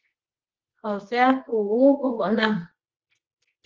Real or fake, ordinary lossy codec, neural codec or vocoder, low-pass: fake; Opus, 16 kbps; codec, 24 kHz, 0.9 kbps, WavTokenizer, medium music audio release; 7.2 kHz